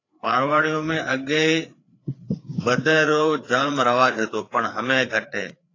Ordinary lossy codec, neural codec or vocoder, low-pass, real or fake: AAC, 32 kbps; codec, 16 kHz, 4 kbps, FreqCodec, larger model; 7.2 kHz; fake